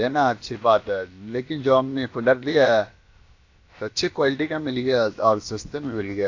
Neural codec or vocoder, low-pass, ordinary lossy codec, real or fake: codec, 16 kHz, about 1 kbps, DyCAST, with the encoder's durations; 7.2 kHz; AAC, 48 kbps; fake